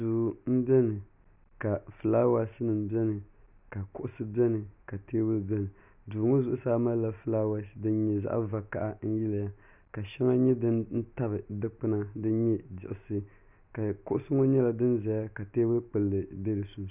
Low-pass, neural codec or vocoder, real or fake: 3.6 kHz; none; real